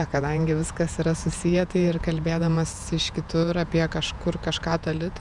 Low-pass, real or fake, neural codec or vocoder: 10.8 kHz; fake; vocoder, 48 kHz, 128 mel bands, Vocos